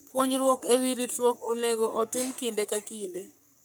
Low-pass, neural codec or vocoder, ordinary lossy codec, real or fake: none; codec, 44.1 kHz, 3.4 kbps, Pupu-Codec; none; fake